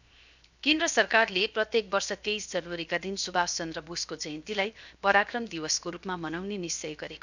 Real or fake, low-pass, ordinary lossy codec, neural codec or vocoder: fake; 7.2 kHz; none; codec, 16 kHz, 0.7 kbps, FocalCodec